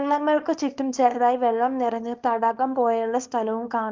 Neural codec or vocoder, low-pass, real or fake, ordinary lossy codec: codec, 24 kHz, 0.9 kbps, WavTokenizer, small release; 7.2 kHz; fake; Opus, 24 kbps